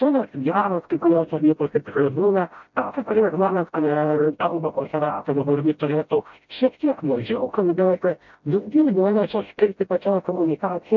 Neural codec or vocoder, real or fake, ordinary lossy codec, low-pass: codec, 16 kHz, 0.5 kbps, FreqCodec, smaller model; fake; MP3, 48 kbps; 7.2 kHz